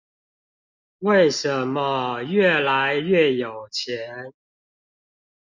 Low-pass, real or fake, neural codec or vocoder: 7.2 kHz; real; none